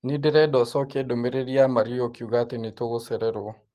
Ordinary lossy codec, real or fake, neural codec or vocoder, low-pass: Opus, 24 kbps; real; none; 14.4 kHz